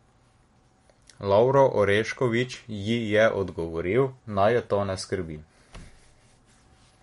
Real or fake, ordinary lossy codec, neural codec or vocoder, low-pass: real; MP3, 48 kbps; none; 19.8 kHz